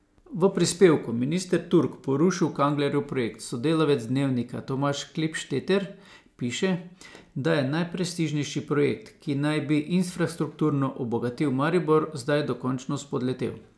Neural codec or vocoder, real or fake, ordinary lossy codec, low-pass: none; real; none; none